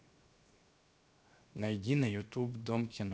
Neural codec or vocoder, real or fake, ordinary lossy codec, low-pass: codec, 16 kHz, 0.7 kbps, FocalCodec; fake; none; none